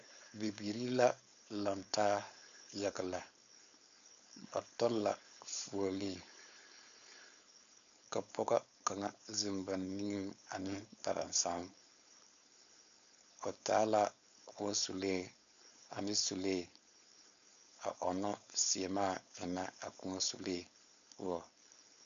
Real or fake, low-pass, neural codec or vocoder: fake; 7.2 kHz; codec, 16 kHz, 4.8 kbps, FACodec